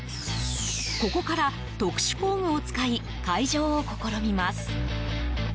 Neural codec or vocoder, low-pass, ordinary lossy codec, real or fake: none; none; none; real